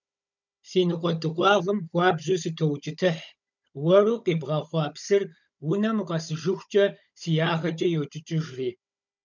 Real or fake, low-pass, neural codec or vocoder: fake; 7.2 kHz; codec, 16 kHz, 16 kbps, FunCodec, trained on Chinese and English, 50 frames a second